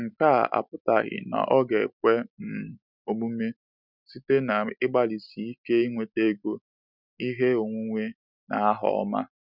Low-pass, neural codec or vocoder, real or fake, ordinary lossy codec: 5.4 kHz; none; real; none